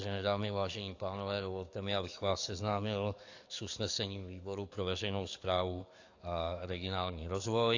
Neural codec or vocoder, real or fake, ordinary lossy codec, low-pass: codec, 44.1 kHz, 7.8 kbps, DAC; fake; AAC, 48 kbps; 7.2 kHz